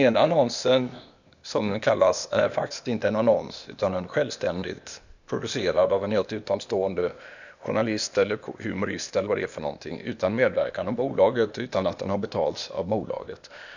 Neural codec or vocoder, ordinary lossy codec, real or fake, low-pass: codec, 16 kHz, 0.8 kbps, ZipCodec; none; fake; 7.2 kHz